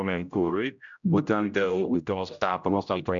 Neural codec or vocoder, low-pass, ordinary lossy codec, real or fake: codec, 16 kHz, 0.5 kbps, X-Codec, HuBERT features, trained on general audio; 7.2 kHz; MP3, 64 kbps; fake